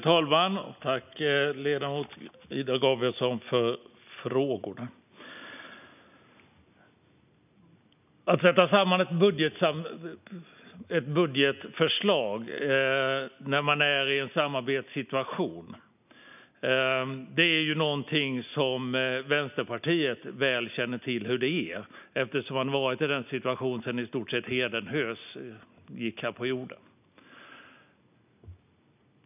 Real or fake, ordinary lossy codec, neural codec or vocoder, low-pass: real; none; none; 3.6 kHz